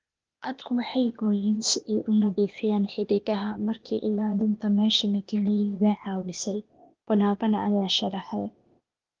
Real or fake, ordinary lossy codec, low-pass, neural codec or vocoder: fake; Opus, 32 kbps; 7.2 kHz; codec, 16 kHz, 0.8 kbps, ZipCodec